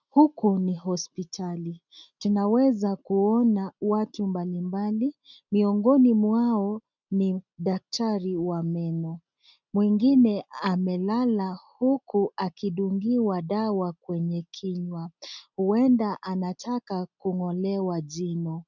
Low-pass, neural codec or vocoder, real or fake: 7.2 kHz; none; real